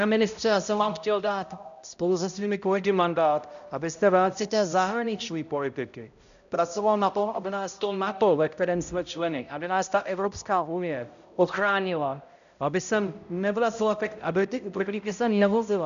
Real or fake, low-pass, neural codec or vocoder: fake; 7.2 kHz; codec, 16 kHz, 0.5 kbps, X-Codec, HuBERT features, trained on balanced general audio